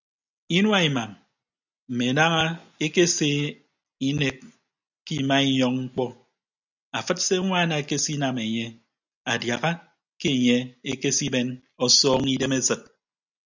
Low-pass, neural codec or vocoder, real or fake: 7.2 kHz; none; real